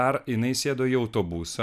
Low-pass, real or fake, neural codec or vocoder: 14.4 kHz; real; none